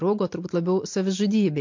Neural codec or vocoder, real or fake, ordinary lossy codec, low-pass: none; real; MP3, 48 kbps; 7.2 kHz